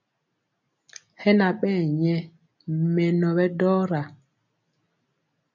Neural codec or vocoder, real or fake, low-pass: none; real; 7.2 kHz